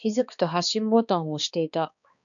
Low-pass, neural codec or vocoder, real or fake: 7.2 kHz; codec, 16 kHz, 2 kbps, X-Codec, HuBERT features, trained on balanced general audio; fake